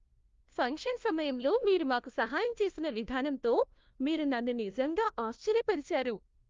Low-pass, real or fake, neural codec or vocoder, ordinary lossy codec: 7.2 kHz; fake; codec, 16 kHz, 1 kbps, FunCodec, trained on LibriTTS, 50 frames a second; Opus, 32 kbps